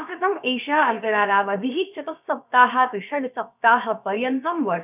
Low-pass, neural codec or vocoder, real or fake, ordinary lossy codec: 3.6 kHz; codec, 16 kHz, about 1 kbps, DyCAST, with the encoder's durations; fake; none